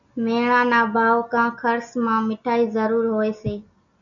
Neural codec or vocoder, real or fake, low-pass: none; real; 7.2 kHz